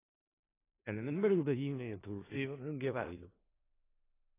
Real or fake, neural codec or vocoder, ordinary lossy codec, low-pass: fake; codec, 16 kHz in and 24 kHz out, 0.4 kbps, LongCat-Audio-Codec, four codebook decoder; AAC, 16 kbps; 3.6 kHz